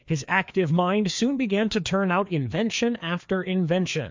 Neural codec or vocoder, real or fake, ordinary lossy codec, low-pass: codec, 16 kHz, 2 kbps, FreqCodec, larger model; fake; MP3, 64 kbps; 7.2 kHz